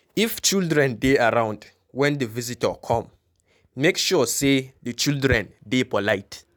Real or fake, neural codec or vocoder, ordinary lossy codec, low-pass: real; none; none; none